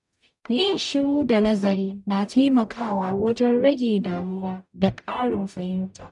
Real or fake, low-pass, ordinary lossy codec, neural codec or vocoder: fake; 10.8 kHz; none; codec, 44.1 kHz, 0.9 kbps, DAC